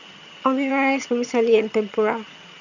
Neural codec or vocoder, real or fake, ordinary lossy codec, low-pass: vocoder, 22.05 kHz, 80 mel bands, HiFi-GAN; fake; none; 7.2 kHz